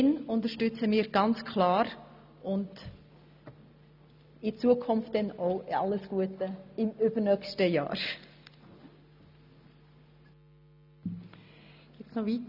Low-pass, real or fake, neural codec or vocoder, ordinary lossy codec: 5.4 kHz; real; none; none